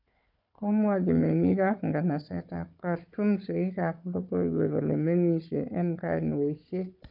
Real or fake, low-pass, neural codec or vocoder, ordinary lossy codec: fake; 5.4 kHz; codec, 16 kHz, 4 kbps, FunCodec, trained on Chinese and English, 50 frames a second; none